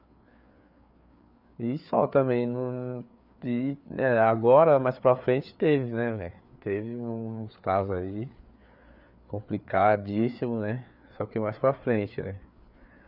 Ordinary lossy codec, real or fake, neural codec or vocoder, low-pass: none; fake; codec, 16 kHz, 4 kbps, FreqCodec, larger model; 5.4 kHz